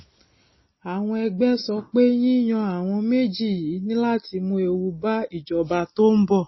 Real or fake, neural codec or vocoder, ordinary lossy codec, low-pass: real; none; MP3, 24 kbps; 7.2 kHz